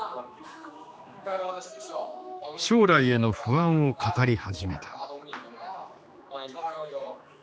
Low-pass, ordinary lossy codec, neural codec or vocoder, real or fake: none; none; codec, 16 kHz, 2 kbps, X-Codec, HuBERT features, trained on general audio; fake